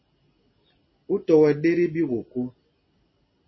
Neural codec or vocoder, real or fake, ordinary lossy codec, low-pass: none; real; MP3, 24 kbps; 7.2 kHz